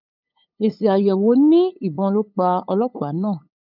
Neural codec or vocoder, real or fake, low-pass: codec, 16 kHz, 8 kbps, FunCodec, trained on LibriTTS, 25 frames a second; fake; 5.4 kHz